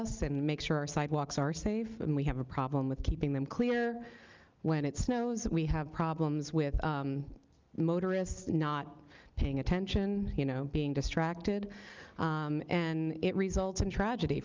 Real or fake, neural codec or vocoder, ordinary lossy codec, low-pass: real; none; Opus, 24 kbps; 7.2 kHz